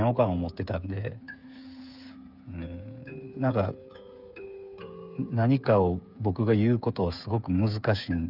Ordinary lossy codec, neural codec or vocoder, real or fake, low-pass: none; codec, 16 kHz, 8 kbps, FreqCodec, smaller model; fake; 5.4 kHz